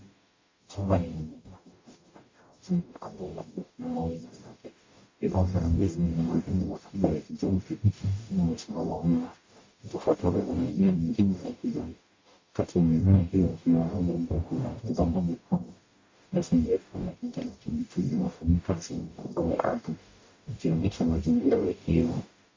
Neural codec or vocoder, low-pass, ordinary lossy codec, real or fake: codec, 44.1 kHz, 0.9 kbps, DAC; 7.2 kHz; MP3, 32 kbps; fake